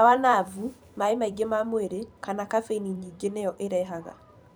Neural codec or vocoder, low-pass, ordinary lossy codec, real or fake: vocoder, 44.1 kHz, 128 mel bands every 512 samples, BigVGAN v2; none; none; fake